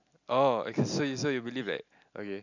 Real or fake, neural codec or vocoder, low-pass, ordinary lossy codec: real; none; 7.2 kHz; none